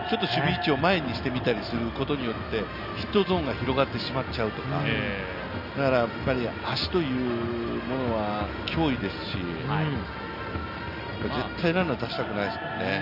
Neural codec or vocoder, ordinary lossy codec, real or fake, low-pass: none; none; real; 5.4 kHz